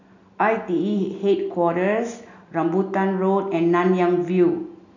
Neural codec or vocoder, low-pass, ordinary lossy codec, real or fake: none; 7.2 kHz; none; real